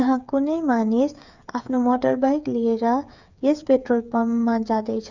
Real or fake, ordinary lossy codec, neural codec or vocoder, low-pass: fake; none; codec, 16 kHz, 8 kbps, FreqCodec, smaller model; 7.2 kHz